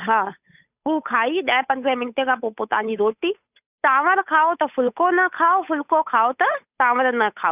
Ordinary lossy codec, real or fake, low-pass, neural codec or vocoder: none; fake; 3.6 kHz; codec, 16 kHz, 8 kbps, FunCodec, trained on Chinese and English, 25 frames a second